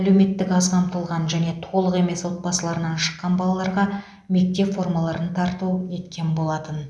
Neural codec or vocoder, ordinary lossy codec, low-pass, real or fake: none; none; none; real